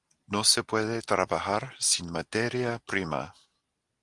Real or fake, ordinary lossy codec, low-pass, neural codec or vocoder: real; Opus, 24 kbps; 10.8 kHz; none